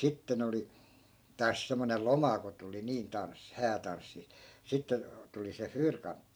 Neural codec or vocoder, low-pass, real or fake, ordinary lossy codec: vocoder, 44.1 kHz, 128 mel bands every 512 samples, BigVGAN v2; none; fake; none